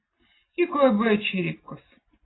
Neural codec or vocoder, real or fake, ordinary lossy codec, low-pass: none; real; AAC, 16 kbps; 7.2 kHz